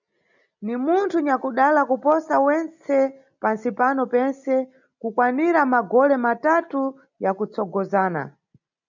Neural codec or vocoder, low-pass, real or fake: none; 7.2 kHz; real